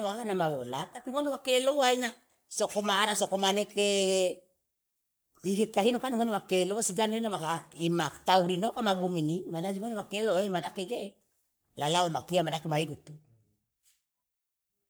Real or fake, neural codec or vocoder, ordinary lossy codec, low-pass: fake; codec, 44.1 kHz, 3.4 kbps, Pupu-Codec; none; none